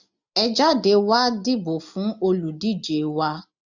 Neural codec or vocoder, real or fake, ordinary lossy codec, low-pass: none; real; none; 7.2 kHz